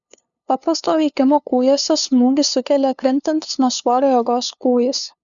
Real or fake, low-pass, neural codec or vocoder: fake; 7.2 kHz; codec, 16 kHz, 2 kbps, FunCodec, trained on LibriTTS, 25 frames a second